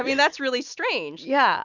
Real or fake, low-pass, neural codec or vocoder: real; 7.2 kHz; none